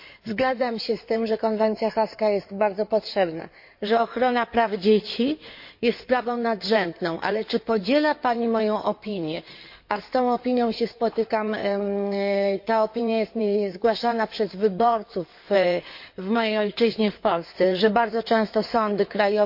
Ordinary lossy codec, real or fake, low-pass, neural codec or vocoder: MP3, 32 kbps; fake; 5.4 kHz; codec, 16 kHz in and 24 kHz out, 2.2 kbps, FireRedTTS-2 codec